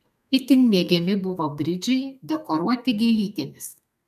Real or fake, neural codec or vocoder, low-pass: fake; codec, 32 kHz, 1.9 kbps, SNAC; 14.4 kHz